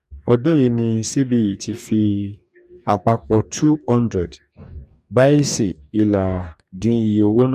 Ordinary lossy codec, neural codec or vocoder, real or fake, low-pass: none; codec, 44.1 kHz, 2.6 kbps, DAC; fake; 14.4 kHz